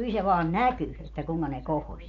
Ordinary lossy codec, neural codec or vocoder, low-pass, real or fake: none; none; 7.2 kHz; real